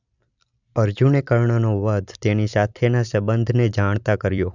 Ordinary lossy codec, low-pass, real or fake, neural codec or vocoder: none; 7.2 kHz; fake; vocoder, 44.1 kHz, 128 mel bands every 256 samples, BigVGAN v2